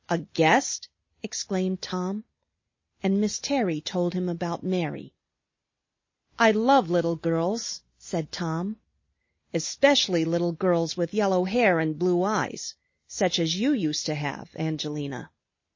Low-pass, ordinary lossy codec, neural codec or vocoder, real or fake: 7.2 kHz; MP3, 32 kbps; none; real